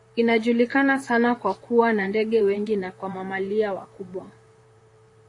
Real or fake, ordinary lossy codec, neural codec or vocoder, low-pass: fake; AAC, 48 kbps; vocoder, 44.1 kHz, 128 mel bands, Pupu-Vocoder; 10.8 kHz